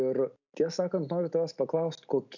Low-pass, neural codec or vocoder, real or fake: 7.2 kHz; none; real